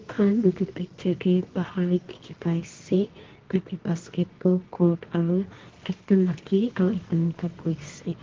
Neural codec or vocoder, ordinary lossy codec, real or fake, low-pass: codec, 24 kHz, 0.9 kbps, WavTokenizer, medium music audio release; Opus, 16 kbps; fake; 7.2 kHz